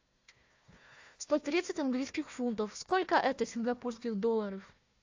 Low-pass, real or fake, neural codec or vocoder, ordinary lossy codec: 7.2 kHz; fake; codec, 16 kHz, 1 kbps, FunCodec, trained on Chinese and English, 50 frames a second; AAC, 48 kbps